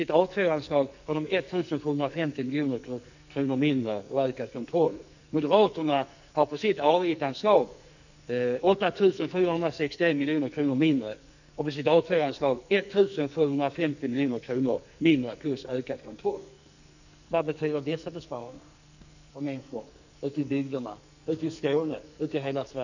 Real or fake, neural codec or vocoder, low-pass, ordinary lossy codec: fake; codec, 44.1 kHz, 2.6 kbps, SNAC; 7.2 kHz; none